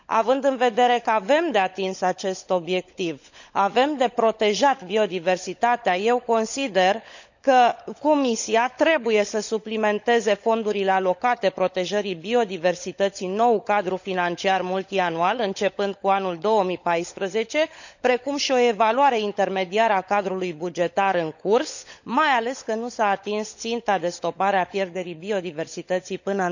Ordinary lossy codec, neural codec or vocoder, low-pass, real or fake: none; codec, 16 kHz, 16 kbps, FunCodec, trained on LibriTTS, 50 frames a second; 7.2 kHz; fake